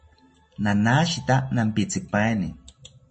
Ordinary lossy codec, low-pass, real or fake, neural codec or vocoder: MP3, 32 kbps; 10.8 kHz; real; none